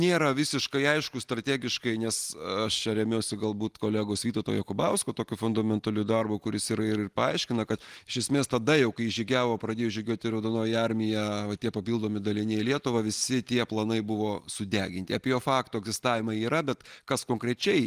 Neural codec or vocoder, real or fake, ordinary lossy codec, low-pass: none; real; Opus, 24 kbps; 14.4 kHz